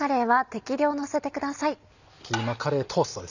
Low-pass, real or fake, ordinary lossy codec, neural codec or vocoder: 7.2 kHz; real; none; none